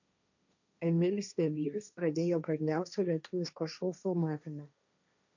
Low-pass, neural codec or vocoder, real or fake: 7.2 kHz; codec, 16 kHz, 1.1 kbps, Voila-Tokenizer; fake